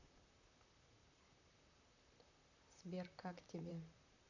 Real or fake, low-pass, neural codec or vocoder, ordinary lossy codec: fake; 7.2 kHz; vocoder, 44.1 kHz, 128 mel bands, Pupu-Vocoder; none